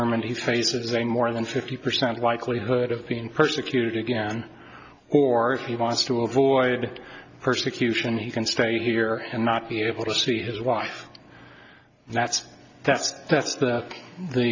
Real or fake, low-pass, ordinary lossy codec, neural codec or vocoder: real; 7.2 kHz; AAC, 48 kbps; none